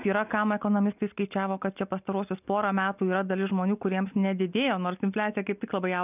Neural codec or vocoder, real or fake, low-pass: none; real; 3.6 kHz